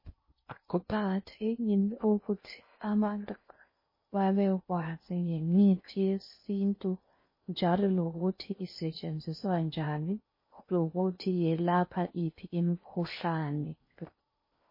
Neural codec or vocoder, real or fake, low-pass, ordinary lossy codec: codec, 16 kHz in and 24 kHz out, 0.6 kbps, FocalCodec, streaming, 2048 codes; fake; 5.4 kHz; MP3, 24 kbps